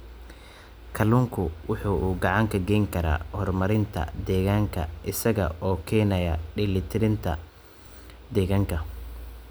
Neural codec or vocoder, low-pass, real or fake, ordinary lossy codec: none; none; real; none